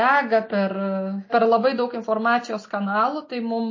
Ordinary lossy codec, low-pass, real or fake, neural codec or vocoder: MP3, 32 kbps; 7.2 kHz; real; none